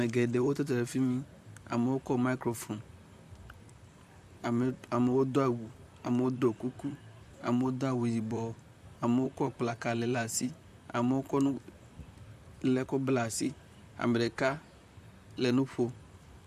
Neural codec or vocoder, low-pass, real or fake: vocoder, 44.1 kHz, 128 mel bands, Pupu-Vocoder; 14.4 kHz; fake